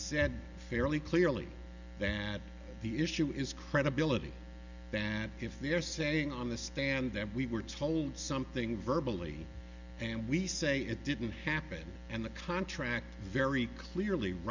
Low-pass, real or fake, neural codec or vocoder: 7.2 kHz; real; none